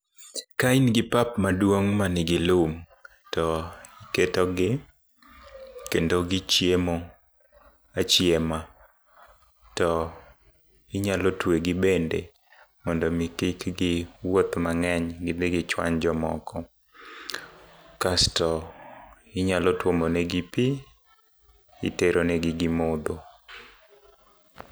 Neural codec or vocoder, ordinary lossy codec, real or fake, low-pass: none; none; real; none